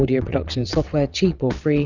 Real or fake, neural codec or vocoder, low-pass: fake; vocoder, 22.05 kHz, 80 mel bands, WaveNeXt; 7.2 kHz